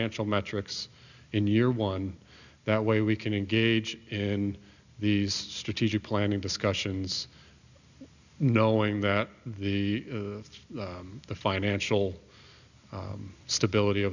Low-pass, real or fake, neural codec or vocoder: 7.2 kHz; real; none